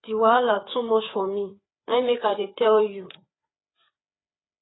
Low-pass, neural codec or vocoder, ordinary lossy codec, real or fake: 7.2 kHz; codec, 16 kHz, 4 kbps, FreqCodec, larger model; AAC, 16 kbps; fake